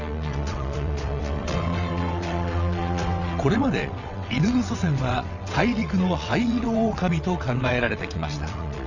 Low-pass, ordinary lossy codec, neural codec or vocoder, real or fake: 7.2 kHz; none; vocoder, 22.05 kHz, 80 mel bands, WaveNeXt; fake